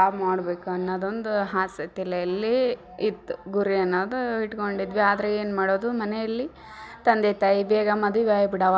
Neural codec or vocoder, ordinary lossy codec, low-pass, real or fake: none; none; none; real